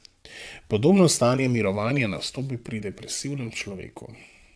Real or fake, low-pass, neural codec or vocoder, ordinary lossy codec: fake; none; vocoder, 22.05 kHz, 80 mel bands, WaveNeXt; none